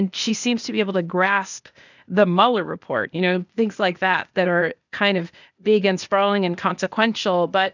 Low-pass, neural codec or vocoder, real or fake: 7.2 kHz; codec, 16 kHz, 0.8 kbps, ZipCodec; fake